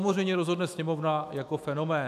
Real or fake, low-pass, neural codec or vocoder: fake; 14.4 kHz; autoencoder, 48 kHz, 128 numbers a frame, DAC-VAE, trained on Japanese speech